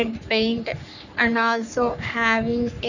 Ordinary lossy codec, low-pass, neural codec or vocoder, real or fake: none; 7.2 kHz; codec, 44.1 kHz, 3.4 kbps, Pupu-Codec; fake